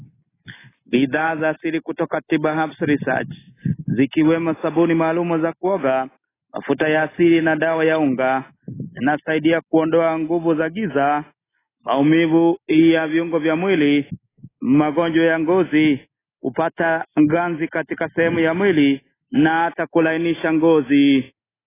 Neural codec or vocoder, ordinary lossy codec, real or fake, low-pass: none; AAC, 16 kbps; real; 3.6 kHz